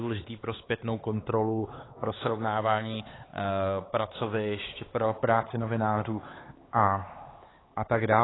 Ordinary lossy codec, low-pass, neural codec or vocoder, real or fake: AAC, 16 kbps; 7.2 kHz; codec, 16 kHz, 4 kbps, X-Codec, HuBERT features, trained on LibriSpeech; fake